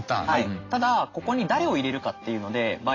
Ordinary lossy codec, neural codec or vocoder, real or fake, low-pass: AAC, 32 kbps; none; real; 7.2 kHz